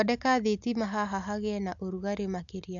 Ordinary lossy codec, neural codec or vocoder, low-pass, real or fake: AAC, 64 kbps; none; 7.2 kHz; real